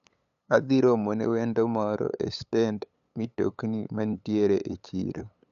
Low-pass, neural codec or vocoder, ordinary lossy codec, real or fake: 7.2 kHz; codec, 16 kHz, 8 kbps, FunCodec, trained on LibriTTS, 25 frames a second; none; fake